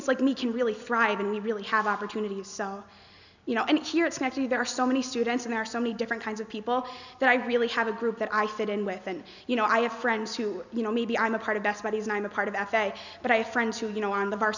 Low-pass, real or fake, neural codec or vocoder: 7.2 kHz; real; none